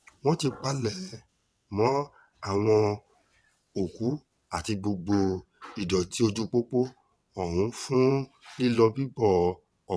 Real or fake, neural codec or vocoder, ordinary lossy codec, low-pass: fake; vocoder, 22.05 kHz, 80 mel bands, WaveNeXt; none; none